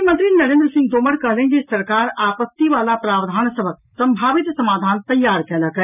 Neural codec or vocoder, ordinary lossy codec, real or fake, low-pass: none; none; real; 3.6 kHz